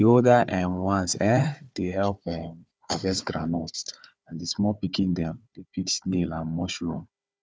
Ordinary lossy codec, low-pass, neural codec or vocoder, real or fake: none; none; codec, 16 kHz, 4 kbps, FunCodec, trained on Chinese and English, 50 frames a second; fake